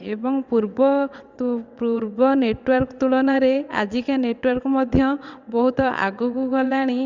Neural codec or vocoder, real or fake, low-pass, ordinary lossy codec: vocoder, 22.05 kHz, 80 mel bands, WaveNeXt; fake; 7.2 kHz; Opus, 64 kbps